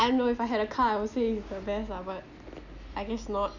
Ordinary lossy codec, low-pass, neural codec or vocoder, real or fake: none; 7.2 kHz; none; real